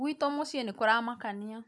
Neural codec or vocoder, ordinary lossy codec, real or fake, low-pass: none; none; real; none